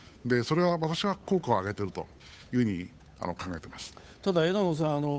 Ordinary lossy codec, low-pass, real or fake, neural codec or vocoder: none; none; fake; codec, 16 kHz, 8 kbps, FunCodec, trained on Chinese and English, 25 frames a second